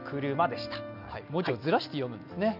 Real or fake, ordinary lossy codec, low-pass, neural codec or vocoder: real; none; 5.4 kHz; none